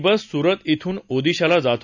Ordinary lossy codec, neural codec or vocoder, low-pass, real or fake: none; none; 7.2 kHz; real